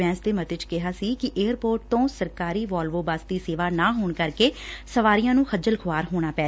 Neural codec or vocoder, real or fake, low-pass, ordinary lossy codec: none; real; none; none